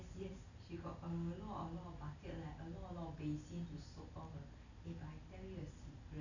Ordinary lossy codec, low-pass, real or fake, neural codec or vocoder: none; 7.2 kHz; real; none